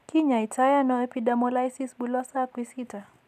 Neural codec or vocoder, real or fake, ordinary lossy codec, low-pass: none; real; none; 14.4 kHz